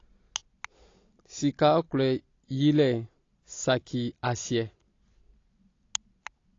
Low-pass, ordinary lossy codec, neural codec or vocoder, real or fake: 7.2 kHz; AAC, 32 kbps; none; real